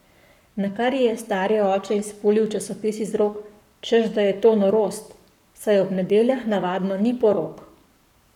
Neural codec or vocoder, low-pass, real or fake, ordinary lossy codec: codec, 44.1 kHz, 7.8 kbps, Pupu-Codec; 19.8 kHz; fake; none